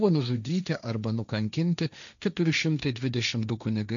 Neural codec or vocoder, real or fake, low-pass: codec, 16 kHz, 1.1 kbps, Voila-Tokenizer; fake; 7.2 kHz